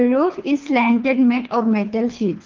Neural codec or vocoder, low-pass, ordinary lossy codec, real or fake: codec, 24 kHz, 3 kbps, HILCodec; 7.2 kHz; Opus, 32 kbps; fake